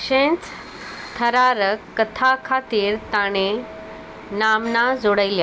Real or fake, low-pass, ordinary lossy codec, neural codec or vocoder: real; none; none; none